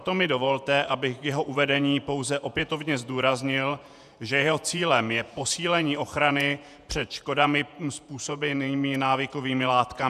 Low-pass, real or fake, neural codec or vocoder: 14.4 kHz; fake; vocoder, 48 kHz, 128 mel bands, Vocos